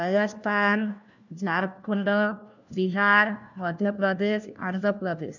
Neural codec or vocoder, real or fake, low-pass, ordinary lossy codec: codec, 16 kHz, 1 kbps, FunCodec, trained on LibriTTS, 50 frames a second; fake; 7.2 kHz; none